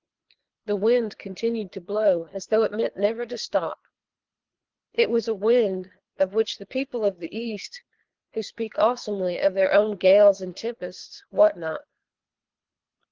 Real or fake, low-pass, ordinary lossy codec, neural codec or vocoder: fake; 7.2 kHz; Opus, 16 kbps; codec, 24 kHz, 3 kbps, HILCodec